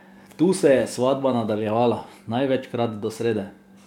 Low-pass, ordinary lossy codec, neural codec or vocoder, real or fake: 19.8 kHz; MP3, 96 kbps; codec, 44.1 kHz, 7.8 kbps, DAC; fake